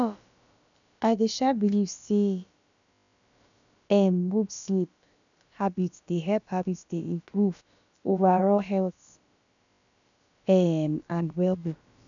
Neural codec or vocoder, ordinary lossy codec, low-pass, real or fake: codec, 16 kHz, about 1 kbps, DyCAST, with the encoder's durations; none; 7.2 kHz; fake